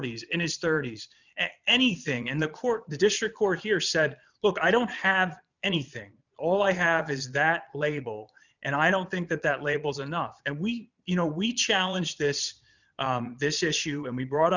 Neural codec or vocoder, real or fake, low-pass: vocoder, 22.05 kHz, 80 mel bands, Vocos; fake; 7.2 kHz